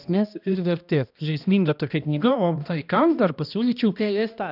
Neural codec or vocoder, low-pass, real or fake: codec, 16 kHz, 1 kbps, X-Codec, HuBERT features, trained on balanced general audio; 5.4 kHz; fake